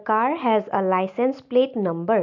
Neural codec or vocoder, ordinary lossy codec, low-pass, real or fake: none; MP3, 64 kbps; 7.2 kHz; real